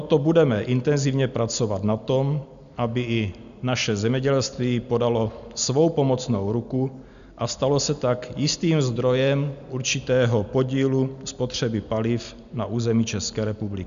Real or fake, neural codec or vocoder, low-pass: real; none; 7.2 kHz